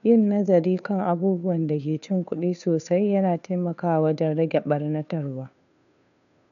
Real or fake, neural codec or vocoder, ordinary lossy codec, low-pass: fake; codec, 16 kHz, 2 kbps, FunCodec, trained on Chinese and English, 25 frames a second; none; 7.2 kHz